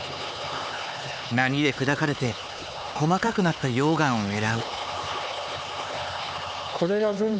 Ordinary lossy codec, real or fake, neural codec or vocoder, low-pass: none; fake; codec, 16 kHz, 4 kbps, X-Codec, HuBERT features, trained on LibriSpeech; none